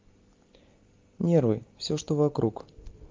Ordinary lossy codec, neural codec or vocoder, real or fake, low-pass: Opus, 24 kbps; none; real; 7.2 kHz